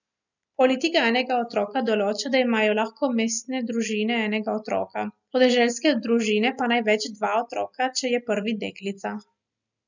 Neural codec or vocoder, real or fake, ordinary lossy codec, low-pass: none; real; none; 7.2 kHz